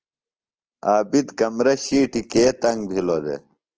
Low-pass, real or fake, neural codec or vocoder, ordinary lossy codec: 7.2 kHz; real; none; Opus, 16 kbps